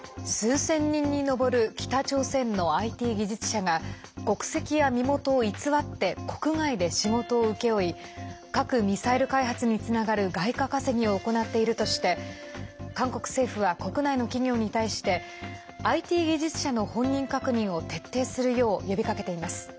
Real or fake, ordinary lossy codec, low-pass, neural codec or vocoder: real; none; none; none